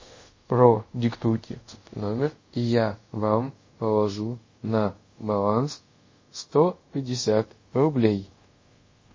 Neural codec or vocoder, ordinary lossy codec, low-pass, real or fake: codec, 24 kHz, 0.5 kbps, DualCodec; MP3, 32 kbps; 7.2 kHz; fake